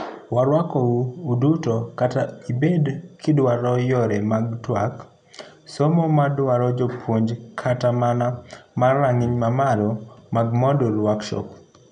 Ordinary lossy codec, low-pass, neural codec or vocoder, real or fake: none; 9.9 kHz; none; real